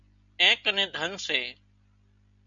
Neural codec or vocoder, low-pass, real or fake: none; 7.2 kHz; real